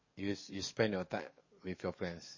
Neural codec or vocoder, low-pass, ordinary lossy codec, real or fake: codec, 44.1 kHz, 7.8 kbps, DAC; 7.2 kHz; MP3, 32 kbps; fake